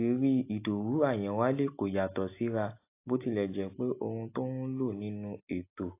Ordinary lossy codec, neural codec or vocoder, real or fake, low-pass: AAC, 24 kbps; none; real; 3.6 kHz